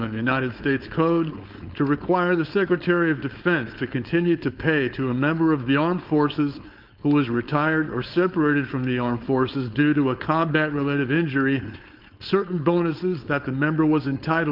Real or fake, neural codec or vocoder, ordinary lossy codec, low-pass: fake; codec, 16 kHz, 4.8 kbps, FACodec; Opus, 24 kbps; 5.4 kHz